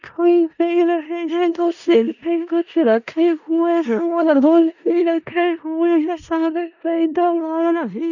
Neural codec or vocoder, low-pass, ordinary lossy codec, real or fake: codec, 16 kHz in and 24 kHz out, 0.4 kbps, LongCat-Audio-Codec, four codebook decoder; 7.2 kHz; none; fake